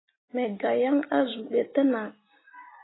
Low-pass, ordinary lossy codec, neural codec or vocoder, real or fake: 7.2 kHz; AAC, 16 kbps; none; real